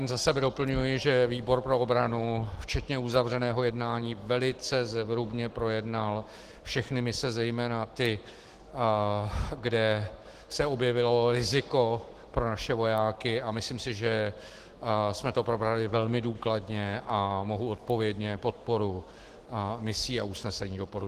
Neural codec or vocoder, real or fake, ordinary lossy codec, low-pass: autoencoder, 48 kHz, 128 numbers a frame, DAC-VAE, trained on Japanese speech; fake; Opus, 16 kbps; 14.4 kHz